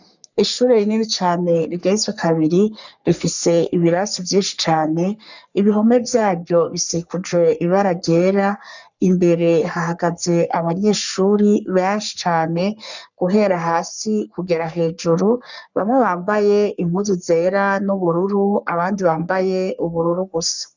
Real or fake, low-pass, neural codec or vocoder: fake; 7.2 kHz; codec, 44.1 kHz, 3.4 kbps, Pupu-Codec